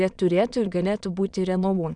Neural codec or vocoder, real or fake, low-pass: autoencoder, 22.05 kHz, a latent of 192 numbers a frame, VITS, trained on many speakers; fake; 9.9 kHz